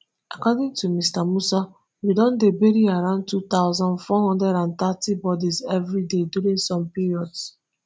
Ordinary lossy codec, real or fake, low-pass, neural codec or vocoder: none; real; none; none